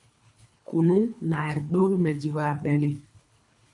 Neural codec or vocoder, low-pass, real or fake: codec, 24 kHz, 3 kbps, HILCodec; 10.8 kHz; fake